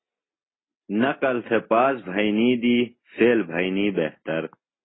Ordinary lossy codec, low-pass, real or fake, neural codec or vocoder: AAC, 16 kbps; 7.2 kHz; real; none